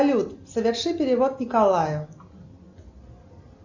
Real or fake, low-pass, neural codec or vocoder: real; 7.2 kHz; none